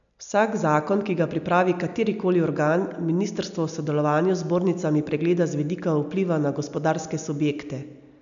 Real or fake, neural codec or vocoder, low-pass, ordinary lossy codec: real; none; 7.2 kHz; none